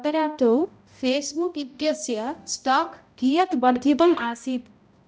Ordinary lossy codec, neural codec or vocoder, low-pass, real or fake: none; codec, 16 kHz, 0.5 kbps, X-Codec, HuBERT features, trained on balanced general audio; none; fake